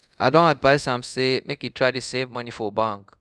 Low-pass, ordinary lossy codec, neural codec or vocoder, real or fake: none; none; codec, 24 kHz, 0.5 kbps, DualCodec; fake